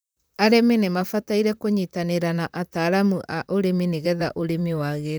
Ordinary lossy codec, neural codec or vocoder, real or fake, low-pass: none; vocoder, 44.1 kHz, 128 mel bands, Pupu-Vocoder; fake; none